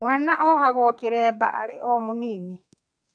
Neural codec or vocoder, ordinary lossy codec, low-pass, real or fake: codec, 32 kHz, 1.9 kbps, SNAC; MP3, 96 kbps; 9.9 kHz; fake